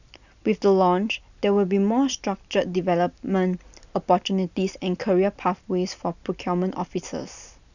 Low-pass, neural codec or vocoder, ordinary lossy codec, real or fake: 7.2 kHz; none; none; real